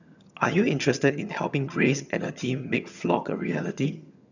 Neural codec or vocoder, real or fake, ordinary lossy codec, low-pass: vocoder, 22.05 kHz, 80 mel bands, HiFi-GAN; fake; none; 7.2 kHz